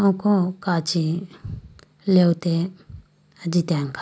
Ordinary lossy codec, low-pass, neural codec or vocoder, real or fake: none; none; none; real